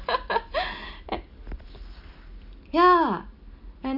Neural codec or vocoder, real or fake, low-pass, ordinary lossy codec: none; real; 5.4 kHz; none